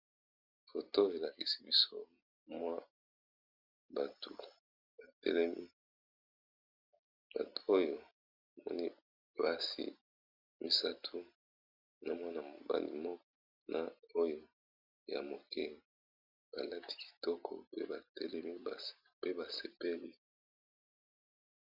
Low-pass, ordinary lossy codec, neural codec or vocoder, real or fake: 5.4 kHz; MP3, 48 kbps; none; real